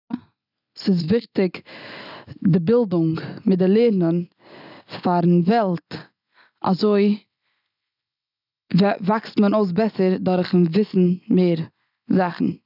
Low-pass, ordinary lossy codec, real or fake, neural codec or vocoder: 5.4 kHz; none; real; none